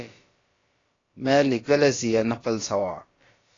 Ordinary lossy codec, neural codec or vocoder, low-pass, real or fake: AAC, 32 kbps; codec, 16 kHz, about 1 kbps, DyCAST, with the encoder's durations; 7.2 kHz; fake